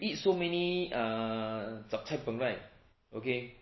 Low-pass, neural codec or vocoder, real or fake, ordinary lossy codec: 7.2 kHz; none; real; MP3, 24 kbps